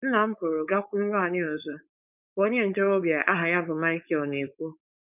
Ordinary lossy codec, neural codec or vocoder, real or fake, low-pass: none; codec, 16 kHz, 4.8 kbps, FACodec; fake; 3.6 kHz